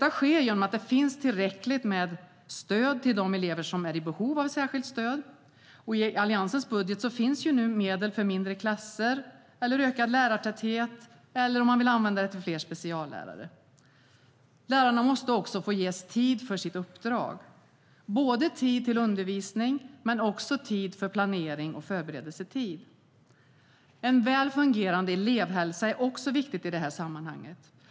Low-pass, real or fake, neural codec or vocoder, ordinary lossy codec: none; real; none; none